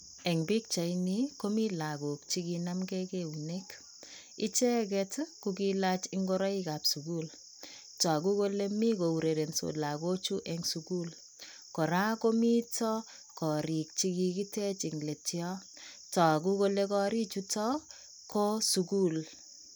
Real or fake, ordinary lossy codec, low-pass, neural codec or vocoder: real; none; none; none